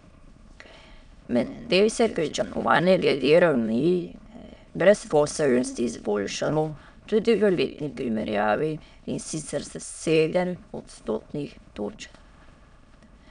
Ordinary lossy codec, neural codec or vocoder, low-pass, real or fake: none; autoencoder, 22.05 kHz, a latent of 192 numbers a frame, VITS, trained on many speakers; 9.9 kHz; fake